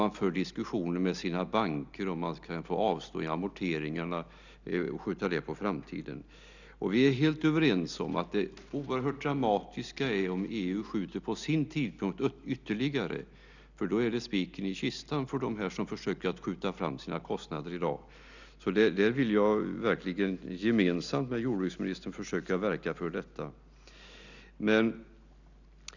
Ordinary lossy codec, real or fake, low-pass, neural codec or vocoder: none; real; 7.2 kHz; none